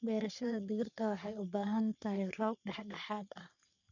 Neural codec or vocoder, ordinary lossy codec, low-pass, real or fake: codec, 44.1 kHz, 3.4 kbps, Pupu-Codec; none; 7.2 kHz; fake